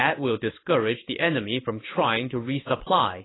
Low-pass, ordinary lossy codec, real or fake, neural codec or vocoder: 7.2 kHz; AAC, 16 kbps; fake; codec, 16 kHz in and 24 kHz out, 1 kbps, XY-Tokenizer